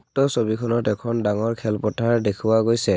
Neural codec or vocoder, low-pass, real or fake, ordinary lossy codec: none; none; real; none